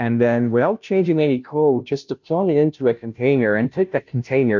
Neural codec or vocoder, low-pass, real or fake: codec, 16 kHz, 0.5 kbps, FunCodec, trained on Chinese and English, 25 frames a second; 7.2 kHz; fake